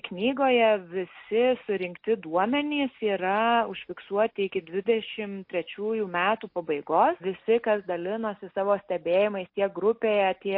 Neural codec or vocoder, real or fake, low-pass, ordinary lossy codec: none; real; 5.4 kHz; MP3, 32 kbps